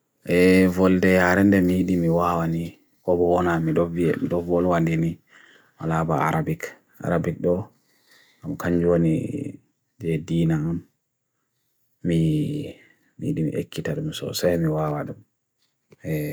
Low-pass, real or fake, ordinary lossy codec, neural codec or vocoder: none; real; none; none